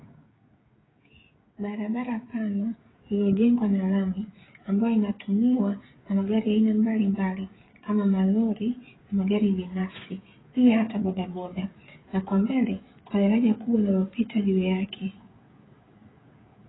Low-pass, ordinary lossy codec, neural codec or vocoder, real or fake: 7.2 kHz; AAC, 16 kbps; codec, 16 kHz, 16 kbps, FreqCodec, smaller model; fake